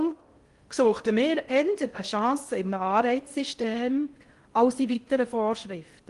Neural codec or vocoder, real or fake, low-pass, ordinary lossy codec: codec, 16 kHz in and 24 kHz out, 0.6 kbps, FocalCodec, streaming, 4096 codes; fake; 10.8 kHz; Opus, 24 kbps